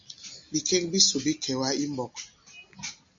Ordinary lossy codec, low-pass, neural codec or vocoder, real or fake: MP3, 48 kbps; 7.2 kHz; none; real